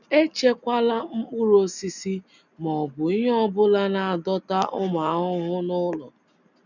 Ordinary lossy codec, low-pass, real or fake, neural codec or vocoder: none; 7.2 kHz; real; none